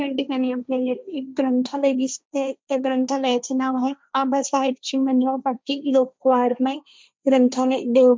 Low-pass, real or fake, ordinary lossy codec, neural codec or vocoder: none; fake; none; codec, 16 kHz, 1.1 kbps, Voila-Tokenizer